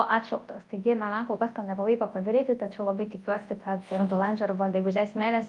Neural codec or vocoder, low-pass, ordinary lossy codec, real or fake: codec, 24 kHz, 0.9 kbps, WavTokenizer, large speech release; 10.8 kHz; Opus, 32 kbps; fake